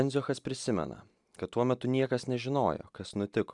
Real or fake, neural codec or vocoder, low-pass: real; none; 10.8 kHz